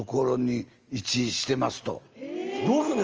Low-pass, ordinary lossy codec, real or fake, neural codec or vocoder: 7.2 kHz; Opus, 24 kbps; real; none